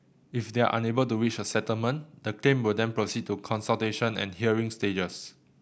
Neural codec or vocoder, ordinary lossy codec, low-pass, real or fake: none; none; none; real